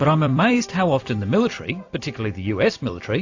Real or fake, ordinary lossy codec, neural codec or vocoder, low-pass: fake; AAC, 48 kbps; vocoder, 44.1 kHz, 128 mel bands every 256 samples, BigVGAN v2; 7.2 kHz